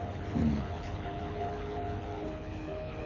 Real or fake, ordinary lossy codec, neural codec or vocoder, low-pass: fake; none; codec, 24 kHz, 6 kbps, HILCodec; 7.2 kHz